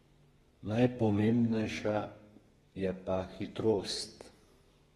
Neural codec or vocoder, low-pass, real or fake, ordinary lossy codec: codec, 32 kHz, 1.9 kbps, SNAC; 14.4 kHz; fake; AAC, 32 kbps